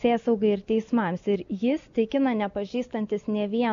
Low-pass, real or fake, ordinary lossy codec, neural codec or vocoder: 7.2 kHz; real; MP3, 64 kbps; none